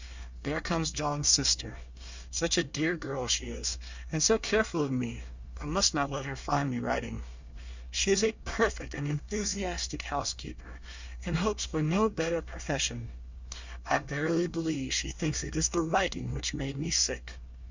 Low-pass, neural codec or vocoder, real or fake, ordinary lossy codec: 7.2 kHz; codec, 24 kHz, 1 kbps, SNAC; fake; Opus, 64 kbps